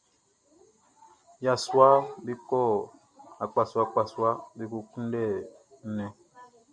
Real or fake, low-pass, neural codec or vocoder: real; 9.9 kHz; none